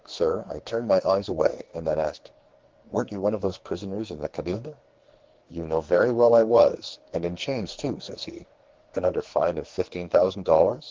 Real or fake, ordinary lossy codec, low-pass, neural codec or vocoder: fake; Opus, 16 kbps; 7.2 kHz; codec, 44.1 kHz, 2.6 kbps, SNAC